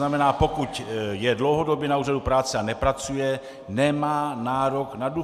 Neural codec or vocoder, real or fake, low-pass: none; real; 14.4 kHz